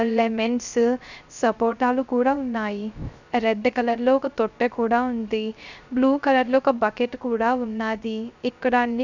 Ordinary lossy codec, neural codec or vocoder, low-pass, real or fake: none; codec, 16 kHz, 0.3 kbps, FocalCodec; 7.2 kHz; fake